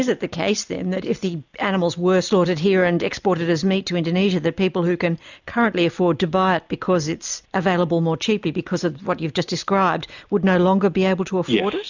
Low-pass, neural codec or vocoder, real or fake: 7.2 kHz; none; real